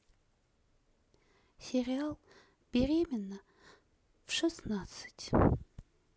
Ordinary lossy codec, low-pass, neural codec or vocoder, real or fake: none; none; none; real